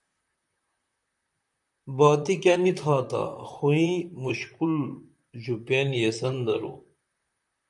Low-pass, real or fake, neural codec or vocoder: 10.8 kHz; fake; vocoder, 44.1 kHz, 128 mel bands, Pupu-Vocoder